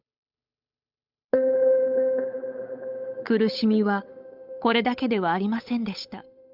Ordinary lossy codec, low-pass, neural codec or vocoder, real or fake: none; 5.4 kHz; codec, 16 kHz, 8 kbps, FunCodec, trained on Chinese and English, 25 frames a second; fake